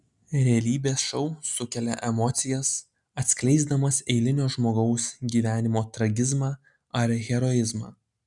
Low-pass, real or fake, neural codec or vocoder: 10.8 kHz; real; none